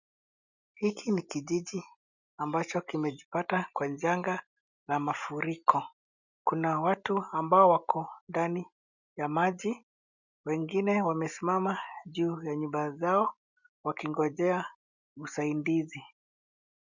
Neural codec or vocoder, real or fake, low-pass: none; real; 7.2 kHz